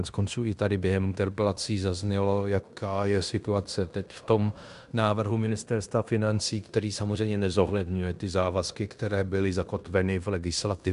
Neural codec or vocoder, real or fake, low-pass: codec, 16 kHz in and 24 kHz out, 0.9 kbps, LongCat-Audio-Codec, fine tuned four codebook decoder; fake; 10.8 kHz